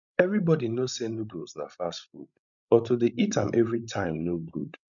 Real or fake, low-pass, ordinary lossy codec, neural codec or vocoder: fake; 7.2 kHz; none; codec, 16 kHz, 16 kbps, FreqCodec, larger model